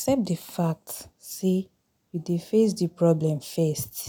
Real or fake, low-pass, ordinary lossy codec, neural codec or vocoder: real; none; none; none